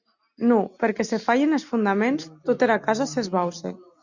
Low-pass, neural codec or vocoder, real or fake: 7.2 kHz; none; real